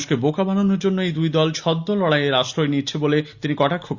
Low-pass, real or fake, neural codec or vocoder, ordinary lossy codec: 7.2 kHz; real; none; Opus, 64 kbps